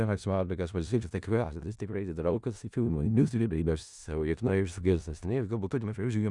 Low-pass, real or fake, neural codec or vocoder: 10.8 kHz; fake; codec, 16 kHz in and 24 kHz out, 0.4 kbps, LongCat-Audio-Codec, four codebook decoder